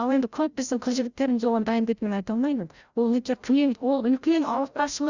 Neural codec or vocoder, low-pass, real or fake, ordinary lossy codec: codec, 16 kHz, 0.5 kbps, FreqCodec, larger model; 7.2 kHz; fake; Opus, 64 kbps